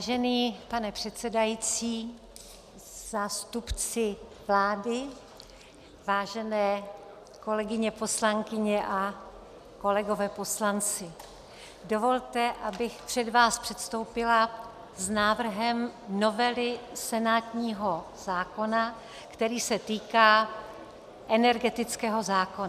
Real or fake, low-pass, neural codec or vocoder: real; 14.4 kHz; none